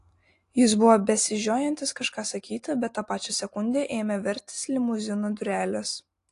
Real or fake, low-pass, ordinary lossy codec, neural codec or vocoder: real; 10.8 kHz; AAC, 48 kbps; none